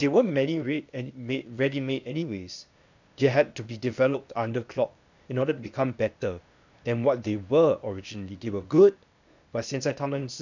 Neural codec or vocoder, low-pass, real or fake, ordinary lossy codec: codec, 16 kHz, 0.8 kbps, ZipCodec; 7.2 kHz; fake; none